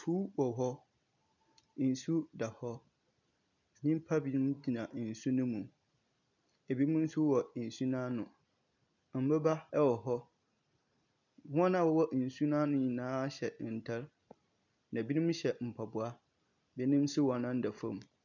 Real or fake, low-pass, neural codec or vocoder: real; 7.2 kHz; none